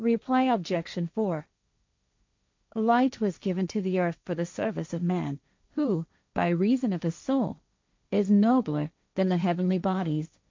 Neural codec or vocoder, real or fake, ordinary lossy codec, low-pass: codec, 16 kHz, 1.1 kbps, Voila-Tokenizer; fake; MP3, 64 kbps; 7.2 kHz